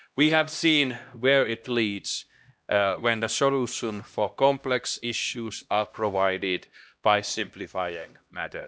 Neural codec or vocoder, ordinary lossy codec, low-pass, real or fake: codec, 16 kHz, 1 kbps, X-Codec, HuBERT features, trained on LibriSpeech; none; none; fake